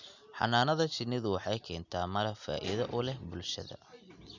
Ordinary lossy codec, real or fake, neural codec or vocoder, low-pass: none; real; none; 7.2 kHz